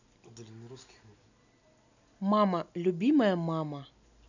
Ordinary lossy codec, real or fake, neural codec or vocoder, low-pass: none; real; none; 7.2 kHz